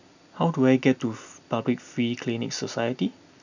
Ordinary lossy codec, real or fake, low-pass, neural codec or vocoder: none; real; 7.2 kHz; none